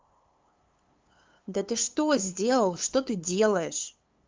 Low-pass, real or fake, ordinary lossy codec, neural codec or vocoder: 7.2 kHz; fake; Opus, 32 kbps; codec, 16 kHz, 8 kbps, FunCodec, trained on LibriTTS, 25 frames a second